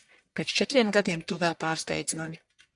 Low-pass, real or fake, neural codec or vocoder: 10.8 kHz; fake; codec, 44.1 kHz, 1.7 kbps, Pupu-Codec